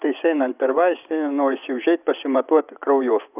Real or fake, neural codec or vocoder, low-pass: fake; vocoder, 24 kHz, 100 mel bands, Vocos; 3.6 kHz